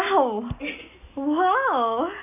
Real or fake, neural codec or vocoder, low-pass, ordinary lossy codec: real; none; 3.6 kHz; none